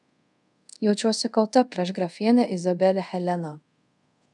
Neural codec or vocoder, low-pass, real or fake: codec, 24 kHz, 0.5 kbps, DualCodec; 10.8 kHz; fake